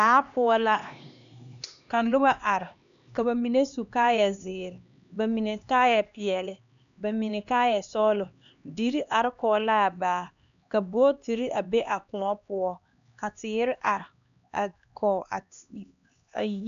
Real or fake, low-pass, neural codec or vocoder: fake; 7.2 kHz; codec, 16 kHz, 2 kbps, X-Codec, HuBERT features, trained on LibriSpeech